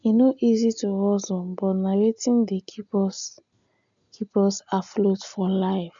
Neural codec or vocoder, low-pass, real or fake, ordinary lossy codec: none; 7.2 kHz; real; none